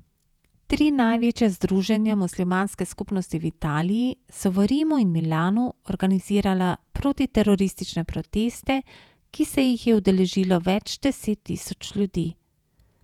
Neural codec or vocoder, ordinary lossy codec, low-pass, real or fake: vocoder, 48 kHz, 128 mel bands, Vocos; none; 19.8 kHz; fake